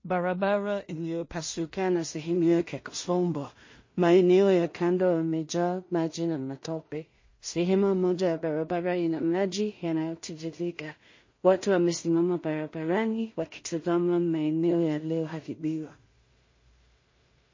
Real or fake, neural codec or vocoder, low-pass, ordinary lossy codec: fake; codec, 16 kHz in and 24 kHz out, 0.4 kbps, LongCat-Audio-Codec, two codebook decoder; 7.2 kHz; MP3, 32 kbps